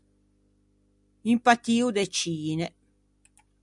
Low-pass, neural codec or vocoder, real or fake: 10.8 kHz; none; real